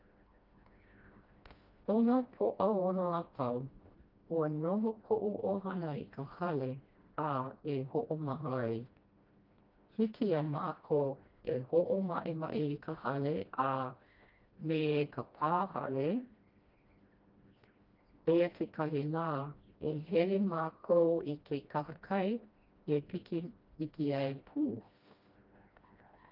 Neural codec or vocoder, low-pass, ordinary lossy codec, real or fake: codec, 16 kHz, 1 kbps, FreqCodec, smaller model; 5.4 kHz; Opus, 32 kbps; fake